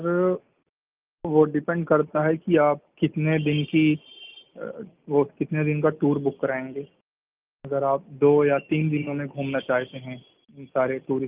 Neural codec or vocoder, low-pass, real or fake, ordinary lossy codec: none; 3.6 kHz; real; Opus, 24 kbps